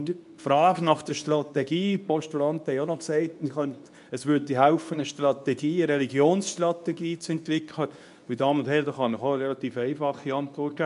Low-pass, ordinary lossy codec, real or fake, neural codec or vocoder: 10.8 kHz; none; fake; codec, 24 kHz, 0.9 kbps, WavTokenizer, medium speech release version 2